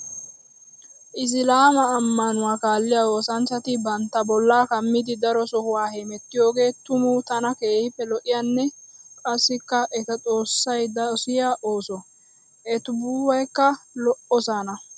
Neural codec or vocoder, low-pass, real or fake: none; 9.9 kHz; real